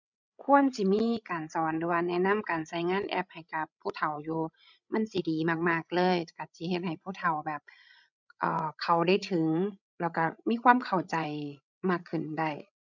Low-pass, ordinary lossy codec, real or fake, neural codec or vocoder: 7.2 kHz; none; fake; codec, 16 kHz, 16 kbps, FreqCodec, larger model